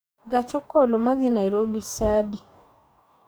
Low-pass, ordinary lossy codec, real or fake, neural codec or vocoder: none; none; fake; codec, 44.1 kHz, 2.6 kbps, DAC